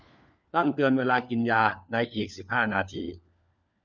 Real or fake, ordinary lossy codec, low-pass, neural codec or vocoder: fake; none; none; codec, 16 kHz, 4 kbps, FunCodec, trained on LibriTTS, 50 frames a second